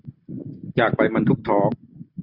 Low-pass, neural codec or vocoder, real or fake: 5.4 kHz; none; real